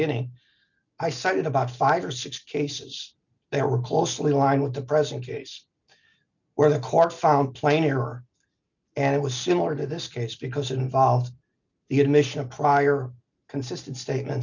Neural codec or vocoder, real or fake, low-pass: none; real; 7.2 kHz